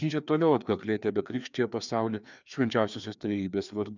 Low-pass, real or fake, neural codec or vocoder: 7.2 kHz; fake; codec, 16 kHz, 2 kbps, FreqCodec, larger model